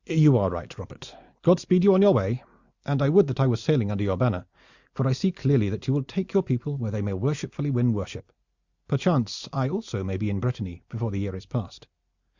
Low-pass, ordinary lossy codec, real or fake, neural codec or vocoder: 7.2 kHz; Opus, 64 kbps; real; none